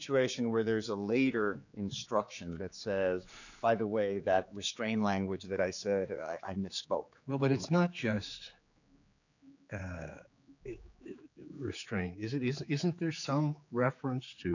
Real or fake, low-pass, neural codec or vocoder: fake; 7.2 kHz; codec, 16 kHz, 4 kbps, X-Codec, HuBERT features, trained on general audio